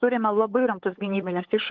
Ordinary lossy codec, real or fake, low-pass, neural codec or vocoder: Opus, 16 kbps; fake; 7.2 kHz; codec, 16 kHz, 8 kbps, FunCodec, trained on LibriTTS, 25 frames a second